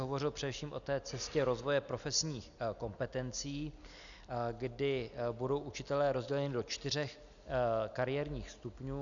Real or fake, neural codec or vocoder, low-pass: real; none; 7.2 kHz